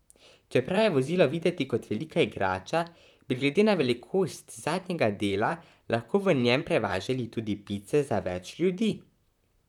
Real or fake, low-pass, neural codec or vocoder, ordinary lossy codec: fake; 19.8 kHz; vocoder, 44.1 kHz, 128 mel bands, Pupu-Vocoder; none